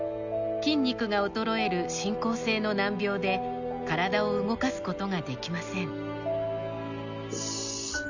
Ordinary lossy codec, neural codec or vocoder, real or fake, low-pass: none; none; real; 7.2 kHz